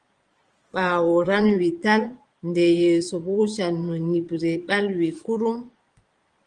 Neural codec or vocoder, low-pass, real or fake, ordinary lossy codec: vocoder, 22.05 kHz, 80 mel bands, Vocos; 9.9 kHz; fake; Opus, 24 kbps